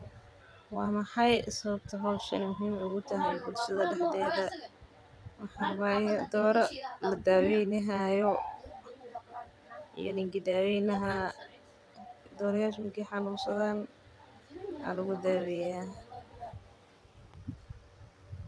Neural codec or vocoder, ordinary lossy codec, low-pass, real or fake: vocoder, 22.05 kHz, 80 mel bands, WaveNeXt; none; none; fake